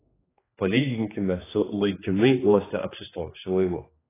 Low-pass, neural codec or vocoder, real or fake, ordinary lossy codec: 3.6 kHz; codec, 16 kHz, 2 kbps, X-Codec, HuBERT features, trained on general audio; fake; AAC, 16 kbps